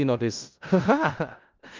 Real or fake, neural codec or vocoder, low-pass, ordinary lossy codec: fake; codec, 16 kHz in and 24 kHz out, 0.4 kbps, LongCat-Audio-Codec, four codebook decoder; 7.2 kHz; Opus, 24 kbps